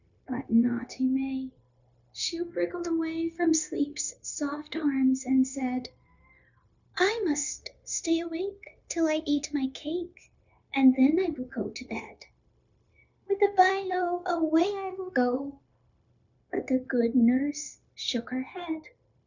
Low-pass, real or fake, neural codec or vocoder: 7.2 kHz; fake; codec, 16 kHz, 0.9 kbps, LongCat-Audio-Codec